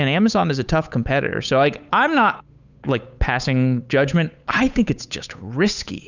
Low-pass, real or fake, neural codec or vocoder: 7.2 kHz; fake; codec, 16 kHz, 8 kbps, FunCodec, trained on Chinese and English, 25 frames a second